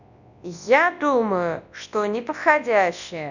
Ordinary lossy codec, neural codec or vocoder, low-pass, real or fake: none; codec, 24 kHz, 0.9 kbps, WavTokenizer, large speech release; 7.2 kHz; fake